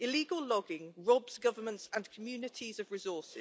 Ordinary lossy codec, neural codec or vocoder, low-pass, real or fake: none; none; none; real